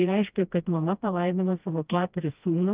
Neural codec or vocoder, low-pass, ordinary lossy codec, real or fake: codec, 16 kHz, 1 kbps, FreqCodec, smaller model; 3.6 kHz; Opus, 24 kbps; fake